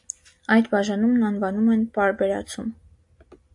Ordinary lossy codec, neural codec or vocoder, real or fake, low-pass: AAC, 64 kbps; none; real; 10.8 kHz